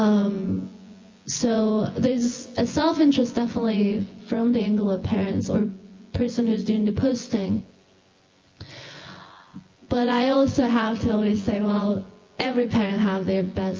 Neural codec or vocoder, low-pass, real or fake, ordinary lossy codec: vocoder, 24 kHz, 100 mel bands, Vocos; 7.2 kHz; fake; Opus, 32 kbps